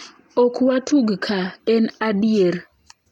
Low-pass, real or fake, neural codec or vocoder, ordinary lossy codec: 19.8 kHz; real; none; none